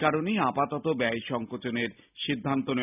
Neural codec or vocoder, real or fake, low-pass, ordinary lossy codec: none; real; 3.6 kHz; none